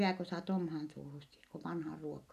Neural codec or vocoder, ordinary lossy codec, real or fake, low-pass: none; none; real; 14.4 kHz